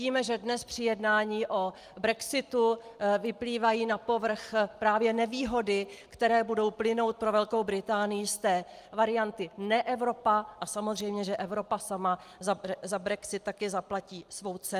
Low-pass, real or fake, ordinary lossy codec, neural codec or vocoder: 14.4 kHz; real; Opus, 32 kbps; none